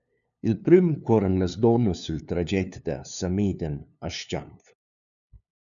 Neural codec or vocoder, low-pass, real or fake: codec, 16 kHz, 2 kbps, FunCodec, trained on LibriTTS, 25 frames a second; 7.2 kHz; fake